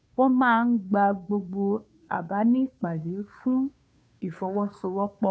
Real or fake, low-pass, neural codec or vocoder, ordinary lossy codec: fake; none; codec, 16 kHz, 2 kbps, FunCodec, trained on Chinese and English, 25 frames a second; none